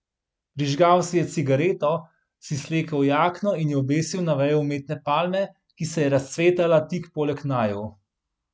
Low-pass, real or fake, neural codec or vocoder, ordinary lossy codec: none; real; none; none